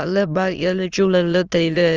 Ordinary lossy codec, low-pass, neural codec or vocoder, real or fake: Opus, 24 kbps; 7.2 kHz; autoencoder, 22.05 kHz, a latent of 192 numbers a frame, VITS, trained on many speakers; fake